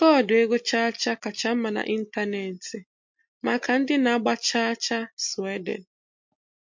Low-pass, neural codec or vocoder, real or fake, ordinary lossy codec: 7.2 kHz; none; real; MP3, 48 kbps